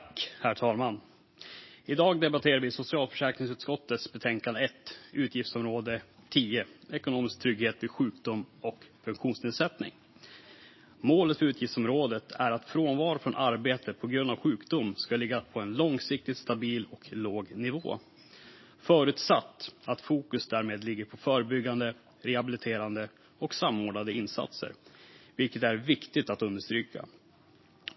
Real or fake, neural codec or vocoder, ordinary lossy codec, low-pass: real; none; MP3, 24 kbps; 7.2 kHz